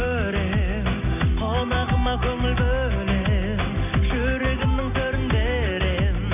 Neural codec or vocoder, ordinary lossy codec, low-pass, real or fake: none; none; 3.6 kHz; real